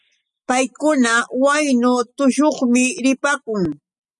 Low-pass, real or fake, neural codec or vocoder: 10.8 kHz; real; none